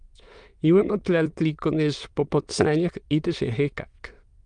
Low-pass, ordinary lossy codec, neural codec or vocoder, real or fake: 9.9 kHz; Opus, 32 kbps; autoencoder, 22.05 kHz, a latent of 192 numbers a frame, VITS, trained on many speakers; fake